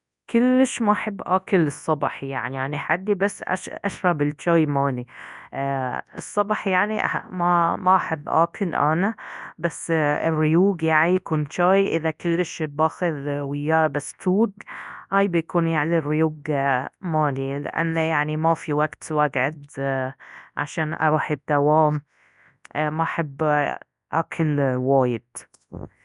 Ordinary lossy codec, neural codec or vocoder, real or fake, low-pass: none; codec, 24 kHz, 0.9 kbps, WavTokenizer, large speech release; fake; 10.8 kHz